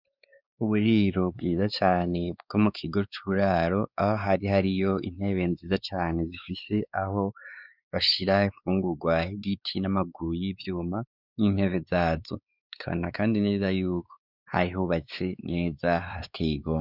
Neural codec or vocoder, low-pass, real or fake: codec, 16 kHz, 4 kbps, X-Codec, WavLM features, trained on Multilingual LibriSpeech; 5.4 kHz; fake